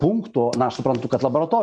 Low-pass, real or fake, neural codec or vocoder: 9.9 kHz; real; none